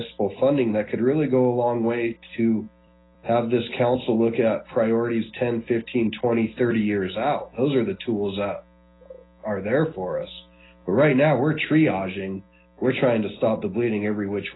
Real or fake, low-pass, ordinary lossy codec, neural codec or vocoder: real; 7.2 kHz; AAC, 16 kbps; none